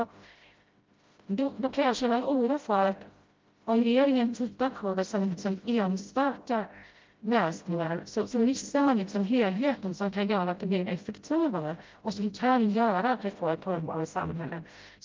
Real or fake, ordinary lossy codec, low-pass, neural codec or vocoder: fake; Opus, 32 kbps; 7.2 kHz; codec, 16 kHz, 0.5 kbps, FreqCodec, smaller model